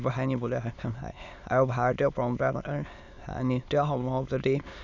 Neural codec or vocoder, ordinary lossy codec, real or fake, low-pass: autoencoder, 22.05 kHz, a latent of 192 numbers a frame, VITS, trained on many speakers; none; fake; 7.2 kHz